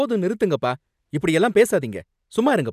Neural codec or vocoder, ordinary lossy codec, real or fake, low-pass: none; none; real; 14.4 kHz